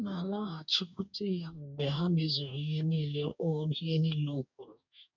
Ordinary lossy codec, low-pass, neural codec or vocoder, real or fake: none; 7.2 kHz; codec, 44.1 kHz, 2.6 kbps, DAC; fake